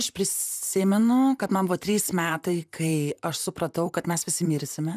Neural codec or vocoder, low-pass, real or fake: vocoder, 44.1 kHz, 128 mel bands, Pupu-Vocoder; 14.4 kHz; fake